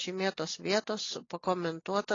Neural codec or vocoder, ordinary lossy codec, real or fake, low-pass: none; AAC, 32 kbps; real; 7.2 kHz